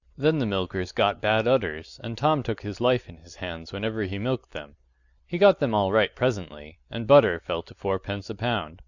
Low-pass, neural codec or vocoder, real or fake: 7.2 kHz; none; real